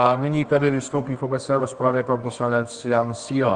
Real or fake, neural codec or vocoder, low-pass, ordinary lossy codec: fake; codec, 24 kHz, 0.9 kbps, WavTokenizer, medium music audio release; 10.8 kHz; Opus, 24 kbps